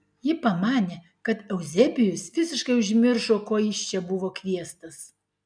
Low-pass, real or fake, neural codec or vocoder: 9.9 kHz; real; none